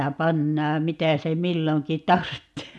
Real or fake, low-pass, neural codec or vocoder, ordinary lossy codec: real; none; none; none